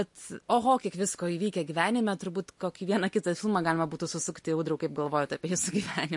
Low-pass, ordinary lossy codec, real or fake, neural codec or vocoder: 10.8 kHz; MP3, 48 kbps; real; none